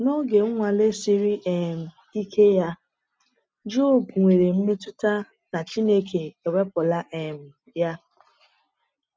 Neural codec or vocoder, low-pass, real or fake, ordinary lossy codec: none; none; real; none